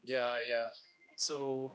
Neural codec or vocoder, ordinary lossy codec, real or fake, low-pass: codec, 16 kHz, 1 kbps, X-Codec, HuBERT features, trained on general audio; none; fake; none